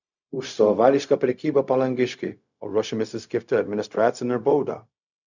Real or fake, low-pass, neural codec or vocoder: fake; 7.2 kHz; codec, 16 kHz, 0.4 kbps, LongCat-Audio-Codec